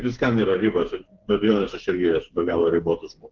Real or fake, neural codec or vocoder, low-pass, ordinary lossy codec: fake; codec, 16 kHz, 4 kbps, FreqCodec, smaller model; 7.2 kHz; Opus, 16 kbps